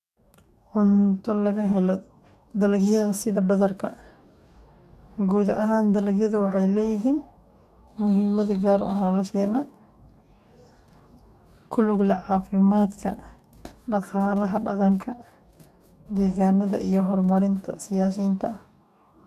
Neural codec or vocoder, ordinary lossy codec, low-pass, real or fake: codec, 44.1 kHz, 2.6 kbps, DAC; none; 14.4 kHz; fake